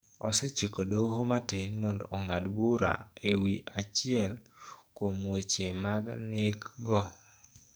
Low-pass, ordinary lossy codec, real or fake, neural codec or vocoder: none; none; fake; codec, 44.1 kHz, 2.6 kbps, SNAC